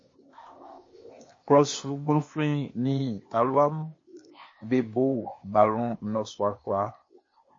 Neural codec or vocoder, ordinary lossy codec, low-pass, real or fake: codec, 16 kHz, 0.8 kbps, ZipCodec; MP3, 32 kbps; 7.2 kHz; fake